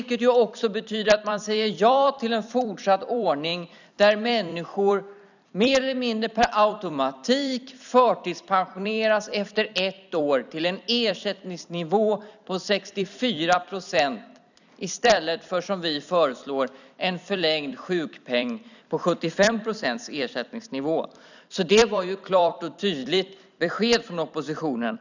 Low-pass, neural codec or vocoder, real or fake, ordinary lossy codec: 7.2 kHz; vocoder, 44.1 kHz, 128 mel bands every 256 samples, BigVGAN v2; fake; none